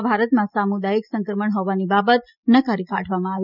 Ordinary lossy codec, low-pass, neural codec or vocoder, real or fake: none; 5.4 kHz; none; real